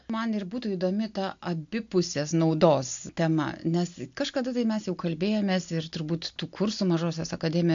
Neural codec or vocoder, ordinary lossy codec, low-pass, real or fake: none; MP3, 64 kbps; 7.2 kHz; real